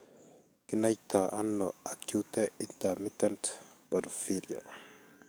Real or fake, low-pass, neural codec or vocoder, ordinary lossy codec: fake; none; codec, 44.1 kHz, 7.8 kbps, DAC; none